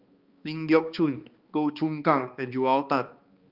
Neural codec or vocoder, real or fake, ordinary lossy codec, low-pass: codec, 16 kHz, 2 kbps, X-Codec, HuBERT features, trained on balanced general audio; fake; Opus, 24 kbps; 5.4 kHz